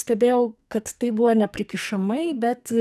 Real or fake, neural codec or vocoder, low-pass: fake; codec, 44.1 kHz, 2.6 kbps, SNAC; 14.4 kHz